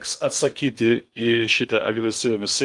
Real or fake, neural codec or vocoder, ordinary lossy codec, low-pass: fake; codec, 16 kHz in and 24 kHz out, 0.8 kbps, FocalCodec, streaming, 65536 codes; Opus, 32 kbps; 10.8 kHz